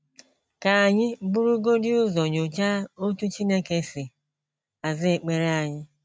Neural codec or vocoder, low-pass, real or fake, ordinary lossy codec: none; none; real; none